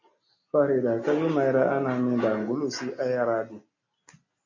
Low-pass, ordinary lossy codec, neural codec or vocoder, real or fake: 7.2 kHz; MP3, 32 kbps; none; real